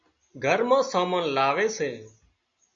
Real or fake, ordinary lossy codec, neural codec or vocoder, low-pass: real; MP3, 48 kbps; none; 7.2 kHz